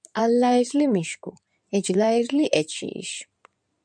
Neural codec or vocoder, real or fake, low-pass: codec, 16 kHz in and 24 kHz out, 2.2 kbps, FireRedTTS-2 codec; fake; 9.9 kHz